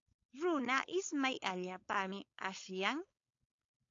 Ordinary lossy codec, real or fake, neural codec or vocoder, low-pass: AAC, 48 kbps; fake; codec, 16 kHz, 4.8 kbps, FACodec; 7.2 kHz